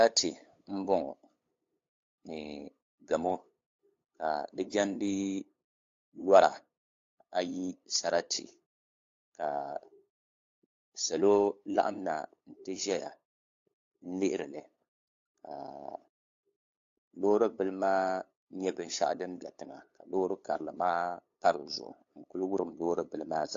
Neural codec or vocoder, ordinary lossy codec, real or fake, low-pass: codec, 16 kHz, 8 kbps, FunCodec, trained on LibriTTS, 25 frames a second; AAC, 48 kbps; fake; 7.2 kHz